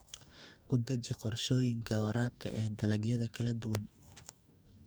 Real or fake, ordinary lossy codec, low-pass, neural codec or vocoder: fake; none; none; codec, 44.1 kHz, 2.6 kbps, DAC